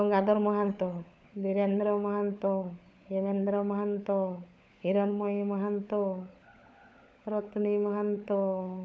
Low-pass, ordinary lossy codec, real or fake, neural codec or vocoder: none; none; fake; codec, 16 kHz, 16 kbps, FunCodec, trained on LibriTTS, 50 frames a second